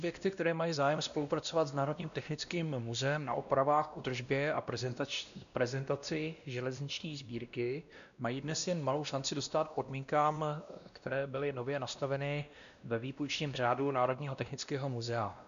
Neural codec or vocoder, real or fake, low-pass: codec, 16 kHz, 1 kbps, X-Codec, WavLM features, trained on Multilingual LibriSpeech; fake; 7.2 kHz